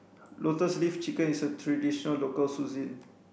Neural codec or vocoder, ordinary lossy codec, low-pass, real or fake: none; none; none; real